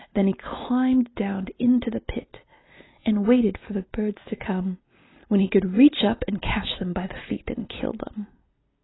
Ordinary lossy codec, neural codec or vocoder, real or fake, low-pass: AAC, 16 kbps; none; real; 7.2 kHz